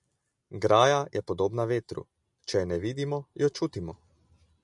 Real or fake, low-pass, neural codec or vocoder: real; 10.8 kHz; none